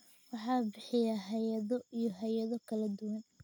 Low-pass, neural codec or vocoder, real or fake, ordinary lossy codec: 19.8 kHz; none; real; none